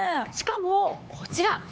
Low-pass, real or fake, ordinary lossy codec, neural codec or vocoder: none; fake; none; codec, 16 kHz, 2 kbps, X-Codec, HuBERT features, trained on LibriSpeech